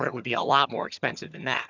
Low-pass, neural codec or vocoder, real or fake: 7.2 kHz; vocoder, 22.05 kHz, 80 mel bands, HiFi-GAN; fake